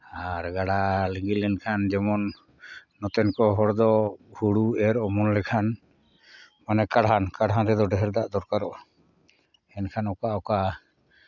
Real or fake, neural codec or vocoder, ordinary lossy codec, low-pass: real; none; none; 7.2 kHz